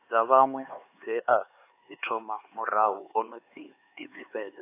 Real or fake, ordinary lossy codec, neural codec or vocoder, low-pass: fake; AAC, 24 kbps; codec, 16 kHz, 4 kbps, X-Codec, HuBERT features, trained on LibriSpeech; 3.6 kHz